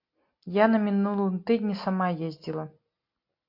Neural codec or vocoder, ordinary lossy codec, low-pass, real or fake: none; MP3, 32 kbps; 5.4 kHz; real